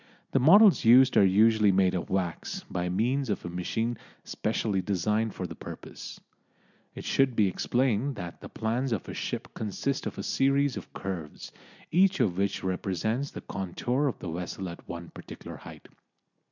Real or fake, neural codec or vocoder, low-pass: real; none; 7.2 kHz